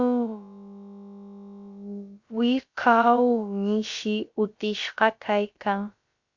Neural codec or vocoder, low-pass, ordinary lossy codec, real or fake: codec, 16 kHz, about 1 kbps, DyCAST, with the encoder's durations; 7.2 kHz; Opus, 64 kbps; fake